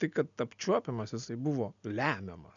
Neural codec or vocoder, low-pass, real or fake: none; 7.2 kHz; real